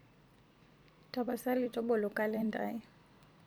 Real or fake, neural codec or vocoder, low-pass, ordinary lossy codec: fake; vocoder, 44.1 kHz, 128 mel bands every 256 samples, BigVGAN v2; none; none